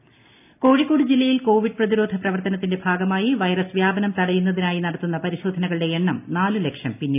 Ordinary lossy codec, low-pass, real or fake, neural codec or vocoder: AAC, 32 kbps; 3.6 kHz; real; none